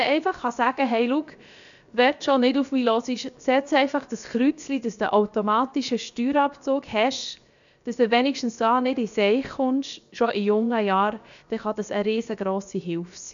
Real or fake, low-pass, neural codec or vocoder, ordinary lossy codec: fake; 7.2 kHz; codec, 16 kHz, 0.7 kbps, FocalCodec; none